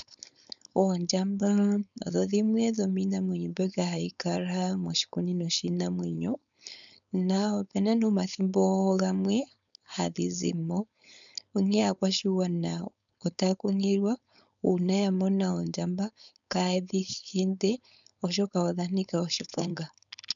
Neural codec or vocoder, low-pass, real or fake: codec, 16 kHz, 4.8 kbps, FACodec; 7.2 kHz; fake